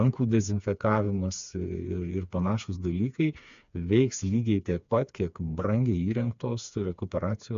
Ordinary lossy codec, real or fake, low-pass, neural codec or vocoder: AAC, 64 kbps; fake; 7.2 kHz; codec, 16 kHz, 4 kbps, FreqCodec, smaller model